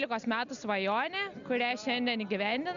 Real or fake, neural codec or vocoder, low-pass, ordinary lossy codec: real; none; 7.2 kHz; Opus, 64 kbps